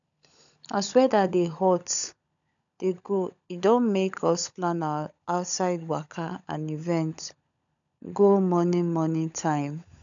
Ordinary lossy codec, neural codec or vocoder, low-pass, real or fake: none; codec, 16 kHz, 16 kbps, FunCodec, trained on LibriTTS, 50 frames a second; 7.2 kHz; fake